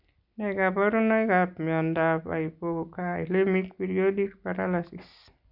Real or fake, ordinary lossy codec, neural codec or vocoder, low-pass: real; none; none; 5.4 kHz